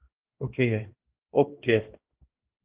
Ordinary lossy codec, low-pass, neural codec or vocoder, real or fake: Opus, 16 kbps; 3.6 kHz; codec, 16 kHz, 1 kbps, X-Codec, HuBERT features, trained on balanced general audio; fake